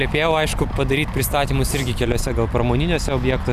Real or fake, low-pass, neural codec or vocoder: real; 14.4 kHz; none